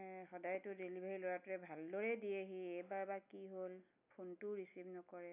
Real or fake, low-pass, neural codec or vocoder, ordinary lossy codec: real; 3.6 kHz; none; none